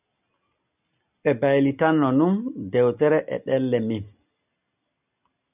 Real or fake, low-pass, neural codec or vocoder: real; 3.6 kHz; none